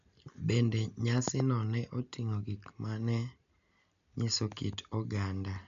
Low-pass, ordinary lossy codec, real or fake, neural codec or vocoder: 7.2 kHz; MP3, 64 kbps; real; none